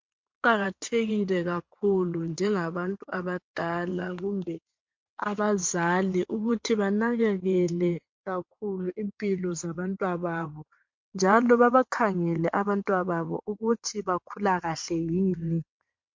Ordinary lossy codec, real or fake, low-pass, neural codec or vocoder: MP3, 48 kbps; fake; 7.2 kHz; vocoder, 22.05 kHz, 80 mel bands, WaveNeXt